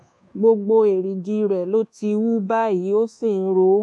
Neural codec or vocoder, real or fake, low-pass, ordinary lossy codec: codec, 24 kHz, 1.2 kbps, DualCodec; fake; none; none